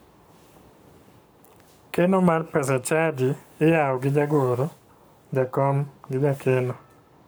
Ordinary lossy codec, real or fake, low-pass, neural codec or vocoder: none; fake; none; codec, 44.1 kHz, 7.8 kbps, Pupu-Codec